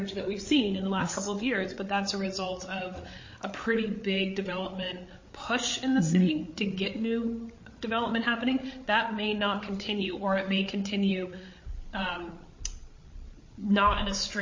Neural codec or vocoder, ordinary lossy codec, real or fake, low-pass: codec, 16 kHz, 8 kbps, FreqCodec, larger model; MP3, 32 kbps; fake; 7.2 kHz